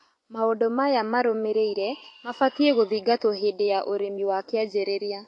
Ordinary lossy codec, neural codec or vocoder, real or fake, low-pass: AAC, 48 kbps; vocoder, 24 kHz, 100 mel bands, Vocos; fake; 10.8 kHz